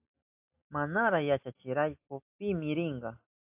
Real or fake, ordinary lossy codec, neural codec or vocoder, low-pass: real; AAC, 32 kbps; none; 3.6 kHz